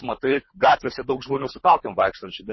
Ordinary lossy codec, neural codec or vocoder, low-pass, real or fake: MP3, 24 kbps; codec, 24 kHz, 3 kbps, HILCodec; 7.2 kHz; fake